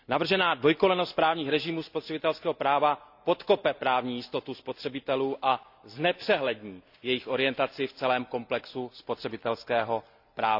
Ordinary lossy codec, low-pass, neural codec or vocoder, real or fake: none; 5.4 kHz; none; real